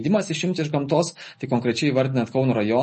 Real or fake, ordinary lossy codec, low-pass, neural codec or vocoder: real; MP3, 32 kbps; 10.8 kHz; none